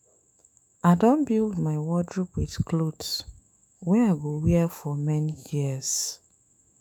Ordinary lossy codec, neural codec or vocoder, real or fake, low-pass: none; autoencoder, 48 kHz, 128 numbers a frame, DAC-VAE, trained on Japanese speech; fake; none